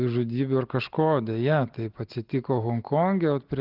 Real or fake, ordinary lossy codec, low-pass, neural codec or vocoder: real; Opus, 16 kbps; 5.4 kHz; none